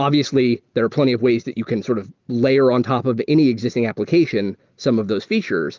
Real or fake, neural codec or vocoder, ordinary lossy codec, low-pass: real; none; Opus, 32 kbps; 7.2 kHz